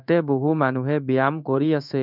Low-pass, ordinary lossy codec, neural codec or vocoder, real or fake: 5.4 kHz; none; codec, 16 kHz in and 24 kHz out, 1 kbps, XY-Tokenizer; fake